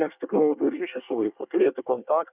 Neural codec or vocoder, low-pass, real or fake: codec, 24 kHz, 1 kbps, SNAC; 3.6 kHz; fake